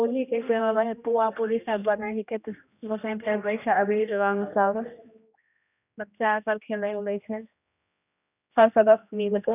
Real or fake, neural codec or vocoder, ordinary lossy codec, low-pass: fake; codec, 16 kHz, 1 kbps, X-Codec, HuBERT features, trained on general audio; none; 3.6 kHz